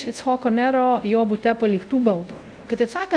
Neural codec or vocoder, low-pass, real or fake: codec, 24 kHz, 0.5 kbps, DualCodec; 9.9 kHz; fake